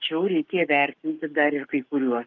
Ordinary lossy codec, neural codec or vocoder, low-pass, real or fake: Opus, 32 kbps; codec, 44.1 kHz, 7.8 kbps, Pupu-Codec; 7.2 kHz; fake